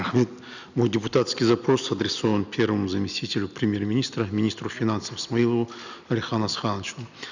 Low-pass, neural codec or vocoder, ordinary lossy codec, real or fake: 7.2 kHz; none; none; real